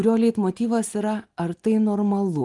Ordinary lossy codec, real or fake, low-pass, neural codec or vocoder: Opus, 24 kbps; real; 10.8 kHz; none